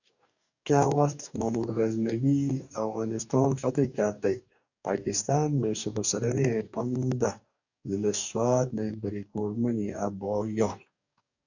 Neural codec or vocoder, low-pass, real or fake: codec, 44.1 kHz, 2.6 kbps, DAC; 7.2 kHz; fake